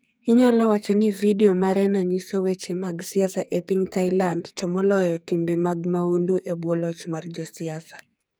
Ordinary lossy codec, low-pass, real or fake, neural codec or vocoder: none; none; fake; codec, 44.1 kHz, 2.6 kbps, SNAC